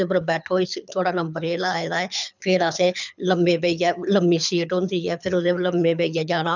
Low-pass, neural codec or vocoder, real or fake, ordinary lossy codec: 7.2 kHz; codec, 24 kHz, 6 kbps, HILCodec; fake; none